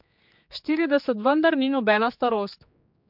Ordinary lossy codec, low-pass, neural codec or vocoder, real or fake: MP3, 48 kbps; 5.4 kHz; codec, 16 kHz, 4 kbps, X-Codec, HuBERT features, trained on general audio; fake